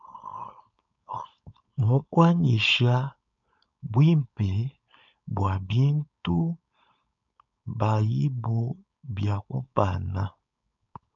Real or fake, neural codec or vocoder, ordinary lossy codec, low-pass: fake; codec, 16 kHz, 4.8 kbps, FACodec; MP3, 64 kbps; 7.2 kHz